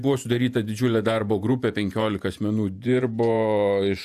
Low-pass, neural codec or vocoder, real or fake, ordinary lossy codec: 14.4 kHz; vocoder, 48 kHz, 128 mel bands, Vocos; fake; AAC, 96 kbps